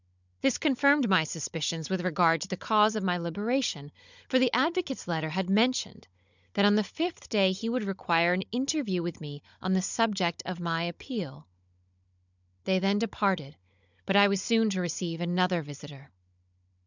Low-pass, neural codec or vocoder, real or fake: 7.2 kHz; codec, 16 kHz, 16 kbps, FunCodec, trained on Chinese and English, 50 frames a second; fake